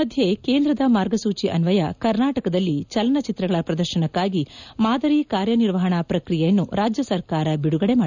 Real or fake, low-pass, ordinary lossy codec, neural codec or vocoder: real; 7.2 kHz; none; none